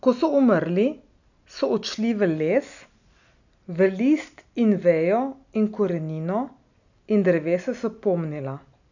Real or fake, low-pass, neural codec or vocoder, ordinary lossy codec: real; 7.2 kHz; none; none